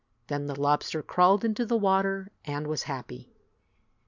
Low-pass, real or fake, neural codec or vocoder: 7.2 kHz; real; none